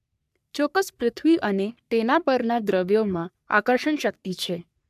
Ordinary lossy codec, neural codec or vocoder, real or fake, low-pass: AAC, 96 kbps; codec, 44.1 kHz, 3.4 kbps, Pupu-Codec; fake; 14.4 kHz